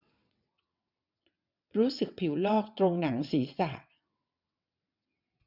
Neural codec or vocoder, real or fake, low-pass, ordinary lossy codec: vocoder, 22.05 kHz, 80 mel bands, WaveNeXt; fake; 5.4 kHz; none